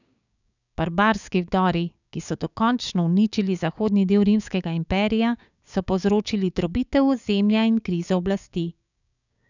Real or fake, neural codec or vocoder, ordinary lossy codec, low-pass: fake; codec, 16 kHz, 6 kbps, DAC; none; 7.2 kHz